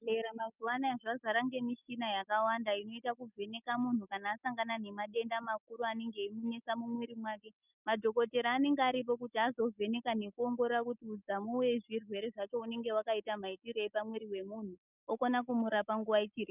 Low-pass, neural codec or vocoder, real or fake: 3.6 kHz; none; real